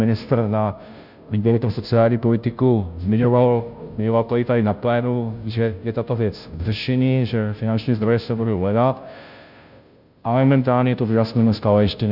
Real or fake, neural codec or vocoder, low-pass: fake; codec, 16 kHz, 0.5 kbps, FunCodec, trained on Chinese and English, 25 frames a second; 5.4 kHz